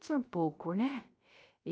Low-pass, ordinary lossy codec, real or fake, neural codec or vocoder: none; none; fake; codec, 16 kHz, 0.3 kbps, FocalCodec